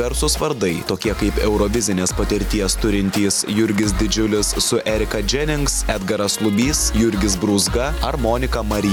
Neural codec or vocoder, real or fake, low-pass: none; real; 19.8 kHz